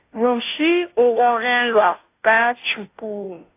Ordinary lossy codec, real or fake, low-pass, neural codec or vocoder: AAC, 24 kbps; fake; 3.6 kHz; codec, 16 kHz, 0.5 kbps, FunCodec, trained on Chinese and English, 25 frames a second